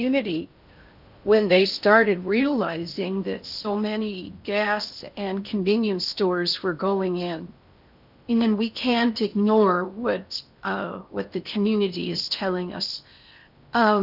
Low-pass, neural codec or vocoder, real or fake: 5.4 kHz; codec, 16 kHz in and 24 kHz out, 0.6 kbps, FocalCodec, streaming, 4096 codes; fake